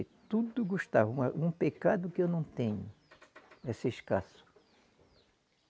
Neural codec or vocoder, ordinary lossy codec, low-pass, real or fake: none; none; none; real